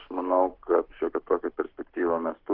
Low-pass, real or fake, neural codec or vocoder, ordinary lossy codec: 5.4 kHz; fake; codec, 44.1 kHz, 7.8 kbps, Pupu-Codec; Opus, 16 kbps